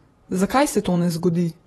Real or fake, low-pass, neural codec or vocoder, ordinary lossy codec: fake; 19.8 kHz; vocoder, 48 kHz, 128 mel bands, Vocos; AAC, 32 kbps